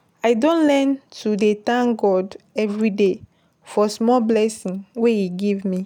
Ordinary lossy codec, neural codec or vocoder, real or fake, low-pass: none; none; real; 19.8 kHz